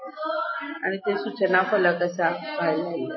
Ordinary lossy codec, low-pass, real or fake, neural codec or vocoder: MP3, 24 kbps; 7.2 kHz; real; none